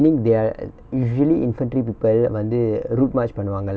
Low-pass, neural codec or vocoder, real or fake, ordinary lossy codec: none; none; real; none